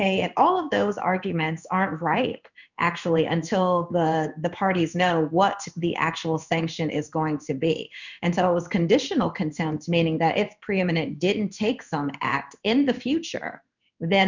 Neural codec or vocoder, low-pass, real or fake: codec, 16 kHz in and 24 kHz out, 1 kbps, XY-Tokenizer; 7.2 kHz; fake